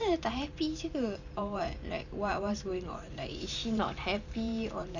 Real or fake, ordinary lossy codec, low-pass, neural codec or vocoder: fake; none; 7.2 kHz; vocoder, 44.1 kHz, 128 mel bands every 512 samples, BigVGAN v2